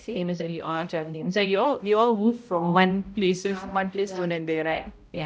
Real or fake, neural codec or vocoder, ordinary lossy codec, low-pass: fake; codec, 16 kHz, 0.5 kbps, X-Codec, HuBERT features, trained on balanced general audio; none; none